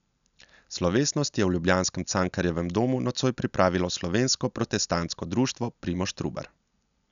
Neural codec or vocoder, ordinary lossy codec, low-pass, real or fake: none; none; 7.2 kHz; real